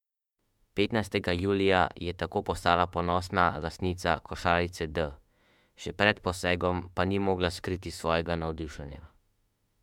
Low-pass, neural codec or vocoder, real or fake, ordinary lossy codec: 19.8 kHz; autoencoder, 48 kHz, 32 numbers a frame, DAC-VAE, trained on Japanese speech; fake; MP3, 96 kbps